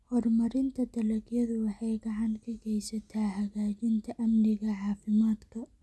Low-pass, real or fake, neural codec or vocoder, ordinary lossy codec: none; real; none; none